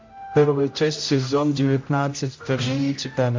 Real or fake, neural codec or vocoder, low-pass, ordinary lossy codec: fake; codec, 16 kHz, 0.5 kbps, X-Codec, HuBERT features, trained on general audio; 7.2 kHz; MP3, 48 kbps